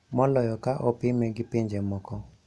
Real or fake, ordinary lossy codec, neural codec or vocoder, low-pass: real; none; none; none